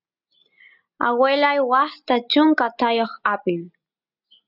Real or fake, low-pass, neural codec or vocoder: real; 5.4 kHz; none